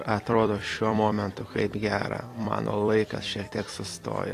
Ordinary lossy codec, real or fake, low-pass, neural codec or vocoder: AAC, 48 kbps; fake; 14.4 kHz; vocoder, 44.1 kHz, 128 mel bands every 256 samples, BigVGAN v2